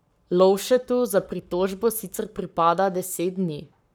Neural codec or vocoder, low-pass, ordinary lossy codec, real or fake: codec, 44.1 kHz, 7.8 kbps, Pupu-Codec; none; none; fake